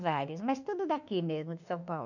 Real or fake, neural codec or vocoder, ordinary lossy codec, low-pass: fake; autoencoder, 48 kHz, 32 numbers a frame, DAC-VAE, trained on Japanese speech; none; 7.2 kHz